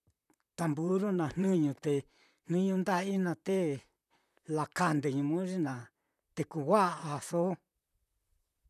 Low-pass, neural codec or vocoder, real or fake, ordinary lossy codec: 14.4 kHz; vocoder, 44.1 kHz, 128 mel bands, Pupu-Vocoder; fake; none